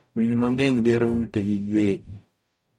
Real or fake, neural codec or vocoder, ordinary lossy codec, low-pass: fake; codec, 44.1 kHz, 0.9 kbps, DAC; MP3, 64 kbps; 19.8 kHz